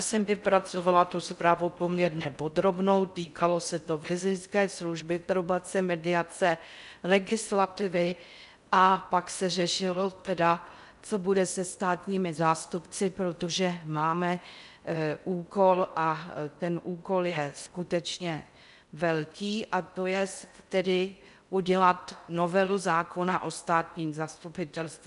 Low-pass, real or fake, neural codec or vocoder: 10.8 kHz; fake; codec, 16 kHz in and 24 kHz out, 0.6 kbps, FocalCodec, streaming, 4096 codes